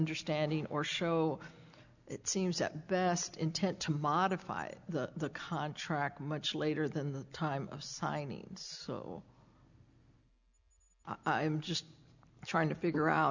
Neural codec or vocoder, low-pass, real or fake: none; 7.2 kHz; real